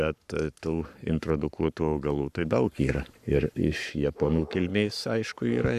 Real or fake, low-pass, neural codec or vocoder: fake; 14.4 kHz; codec, 44.1 kHz, 7.8 kbps, Pupu-Codec